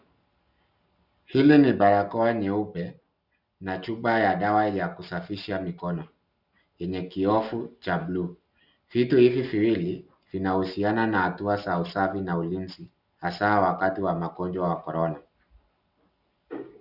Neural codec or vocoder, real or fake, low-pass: none; real; 5.4 kHz